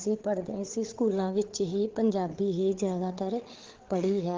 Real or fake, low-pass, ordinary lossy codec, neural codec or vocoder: fake; 7.2 kHz; Opus, 16 kbps; codec, 16 kHz, 8 kbps, FreqCodec, larger model